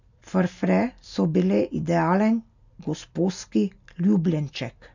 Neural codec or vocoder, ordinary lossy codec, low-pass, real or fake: none; none; 7.2 kHz; real